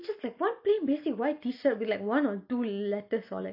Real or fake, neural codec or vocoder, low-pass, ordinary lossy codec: real; none; 5.4 kHz; none